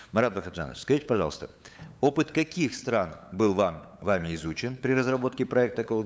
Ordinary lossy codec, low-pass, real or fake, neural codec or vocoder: none; none; fake; codec, 16 kHz, 8 kbps, FunCodec, trained on LibriTTS, 25 frames a second